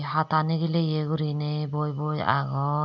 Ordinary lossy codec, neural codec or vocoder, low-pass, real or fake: none; none; 7.2 kHz; real